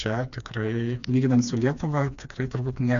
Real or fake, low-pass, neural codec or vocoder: fake; 7.2 kHz; codec, 16 kHz, 2 kbps, FreqCodec, smaller model